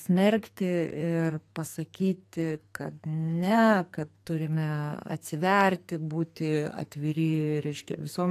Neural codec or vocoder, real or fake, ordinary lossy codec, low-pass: codec, 44.1 kHz, 2.6 kbps, SNAC; fake; AAC, 64 kbps; 14.4 kHz